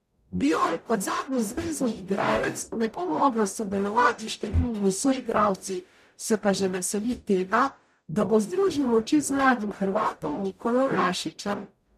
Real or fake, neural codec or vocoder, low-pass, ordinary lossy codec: fake; codec, 44.1 kHz, 0.9 kbps, DAC; 14.4 kHz; none